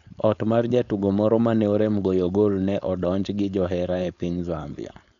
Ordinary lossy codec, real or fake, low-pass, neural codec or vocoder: none; fake; 7.2 kHz; codec, 16 kHz, 4.8 kbps, FACodec